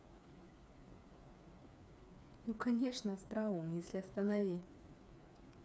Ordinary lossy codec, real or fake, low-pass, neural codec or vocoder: none; fake; none; codec, 16 kHz, 4 kbps, FreqCodec, smaller model